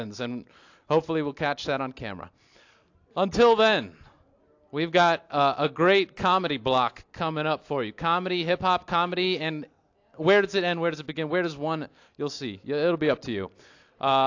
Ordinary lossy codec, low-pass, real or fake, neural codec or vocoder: AAC, 48 kbps; 7.2 kHz; real; none